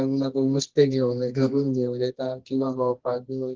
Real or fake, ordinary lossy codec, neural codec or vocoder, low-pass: fake; Opus, 16 kbps; codec, 24 kHz, 0.9 kbps, WavTokenizer, medium music audio release; 7.2 kHz